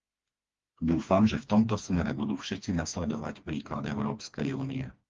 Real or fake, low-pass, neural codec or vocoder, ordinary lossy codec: fake; 7.2 kHz; codec, 16 kHz, 2 kbps, FreqCodec, smaller model; Opus, 32 kbps